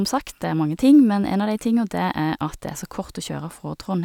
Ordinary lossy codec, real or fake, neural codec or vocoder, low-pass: none; real; none; 19.8 kHz